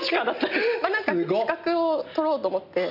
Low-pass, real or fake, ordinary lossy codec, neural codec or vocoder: 5.4 kHz; real; none; none